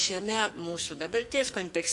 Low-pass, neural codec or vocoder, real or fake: 10.8 kHz; codec, 44.1 kHz, 2.6 kbps, SNAC; fake